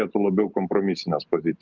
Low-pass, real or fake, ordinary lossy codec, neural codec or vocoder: 7.2 kHz; real; Opus, 32 kbps; none